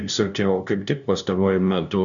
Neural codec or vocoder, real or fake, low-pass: codec, 16 kHz, 0.5 kbps, FunCodec, trained on LibriTTS, 25 frames a second; fake; 7.2 kHz